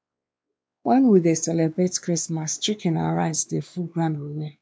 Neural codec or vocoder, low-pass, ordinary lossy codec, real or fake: codec, 16 kHz, 2 kbps, X-Codec, WavLM features, trained on Multilingual LibriSpeech; none; none; fake